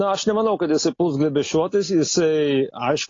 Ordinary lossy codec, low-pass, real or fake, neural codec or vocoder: AAC, 32 kbps; 7.2 kHz; real; none